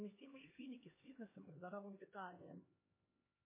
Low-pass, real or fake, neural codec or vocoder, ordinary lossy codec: 3.6 kHz; fake; codec, 16 kHz, 2 kbps, X-Codec, HuBERT features, trained on LibriSpeech; MP3, 16 kbps